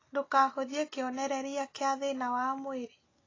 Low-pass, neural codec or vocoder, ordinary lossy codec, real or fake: 7.2 kHz; none; AAC, 32 kbps; real